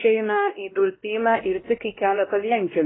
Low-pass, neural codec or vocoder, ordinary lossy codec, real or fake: 7.2 kHz; codec, 16 kHz, 1 kbps, X-Codec, WavLM features, trained on Multilingual LibriSpeech; AAC, 16 kbps; fake